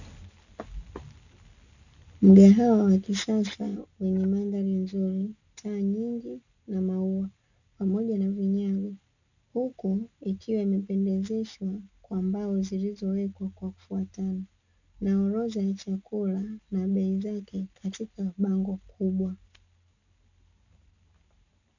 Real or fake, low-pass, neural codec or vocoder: real; 7.2 kHz; none